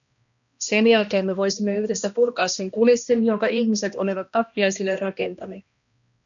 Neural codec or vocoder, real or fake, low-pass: codec, 16 kHz, 1 kbps, X-Codec, HuBERT features, trained on balanced general audio; fake; 7.2 kHz